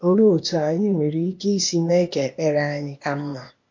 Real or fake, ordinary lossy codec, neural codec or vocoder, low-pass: fake; MP3, 48 kbps; codec, 16 kHz, 0.8 kbps, ZipCodec; 7.2 kHz